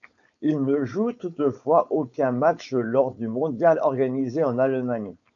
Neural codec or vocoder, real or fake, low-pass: codec, 16 kHz, 4.8 kbps, FACodec; fake; 7.2 kHz